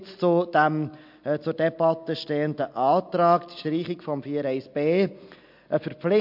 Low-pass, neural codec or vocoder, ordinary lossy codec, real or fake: 5.4 kHz; none; MP3, 48 kbps; real